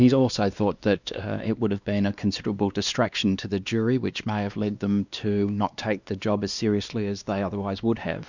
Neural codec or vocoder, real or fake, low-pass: codec, 16 kHz, 2 kbps, X-Codec, WavLM features, trained on Multilingual LibriSpeech; fake; 7.2 kHz